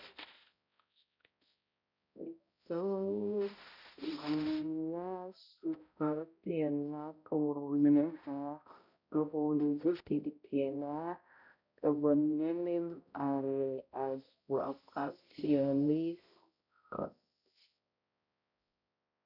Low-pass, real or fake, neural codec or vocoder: 5.4 kHz; fake; codec, 16 kHz, 0.5 kbps, X-Codec, HuBERT features, trained on balanced general audio